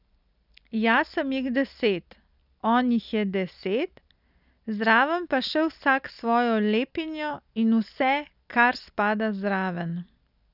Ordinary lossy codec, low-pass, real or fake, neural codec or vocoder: none; 5.4 kHz; real; none